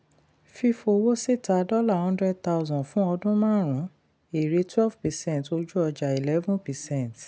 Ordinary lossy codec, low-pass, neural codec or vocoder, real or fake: none; none; none; real